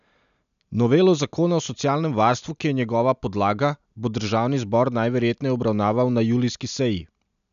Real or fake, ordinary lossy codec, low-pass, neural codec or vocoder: real; none; 7.2 kHz; none